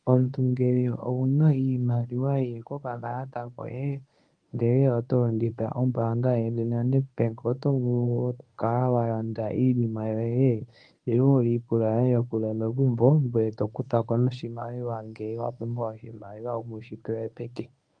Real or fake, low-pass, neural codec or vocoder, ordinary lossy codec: fake; 9.9 kHz; codec, 24 kHz, 0.9 kbps, WavTokenizer, medium speech release version 1; Opus, 32 kbps